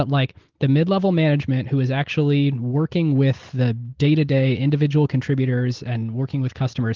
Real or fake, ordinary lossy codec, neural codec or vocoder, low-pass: real; Opus, 16 kbps; none; 7.2 kHz